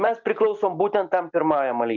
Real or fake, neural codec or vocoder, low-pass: real; none; 7.2 kHz